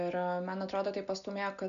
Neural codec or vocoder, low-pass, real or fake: none; 7.2 kHz; real